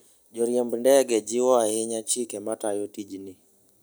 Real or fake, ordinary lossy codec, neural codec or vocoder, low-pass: real; none; none; none